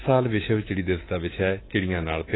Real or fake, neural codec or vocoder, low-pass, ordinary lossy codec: fake; codec, 24 kHz, 3.1 kbps, DualCodec; 7.2 kHz; AAC, 16 kbps